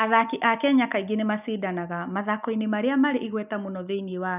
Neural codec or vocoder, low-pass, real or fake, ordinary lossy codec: none; 3.6 kHz; real; none